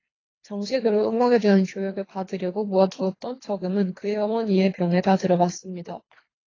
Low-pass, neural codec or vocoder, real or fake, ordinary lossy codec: 7.2 kHz; codec, 24 kHz, 3 kbps, HILCodec; fake; AAC, 32 kbps